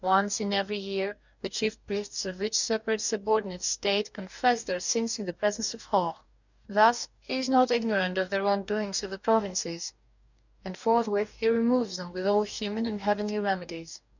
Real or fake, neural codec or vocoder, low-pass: fake; codec, 44.1 kHz, 2.6 kbps, DAC; 7.2 kHz